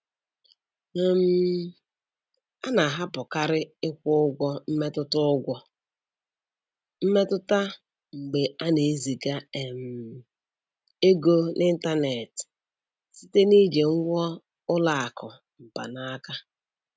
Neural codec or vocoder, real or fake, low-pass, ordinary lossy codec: none; real; none; none